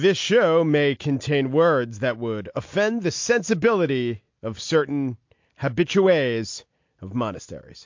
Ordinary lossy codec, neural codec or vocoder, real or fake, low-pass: MP3, 48 kbps; none; real; 7.2 kHz